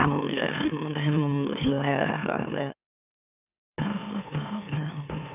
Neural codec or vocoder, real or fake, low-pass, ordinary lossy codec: autoencoder, 44.1 kHz, a latent of 192 numbers a frame, MeloTTS; fake; 3.6 kHz; none